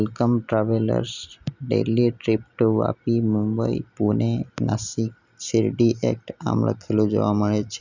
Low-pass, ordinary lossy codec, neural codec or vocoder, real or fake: 7.2 kHz; none; none; real